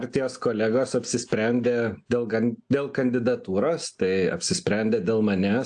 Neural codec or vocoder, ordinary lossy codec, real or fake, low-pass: none; AAC, 64 kbps; real; 9.9 kHz